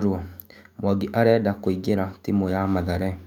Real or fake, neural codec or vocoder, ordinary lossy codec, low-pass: fake; autoencoder, 48 kHz, 128 numbers a frame, DAC-VAE, trained on Japanese speech; none; 19.8 kHz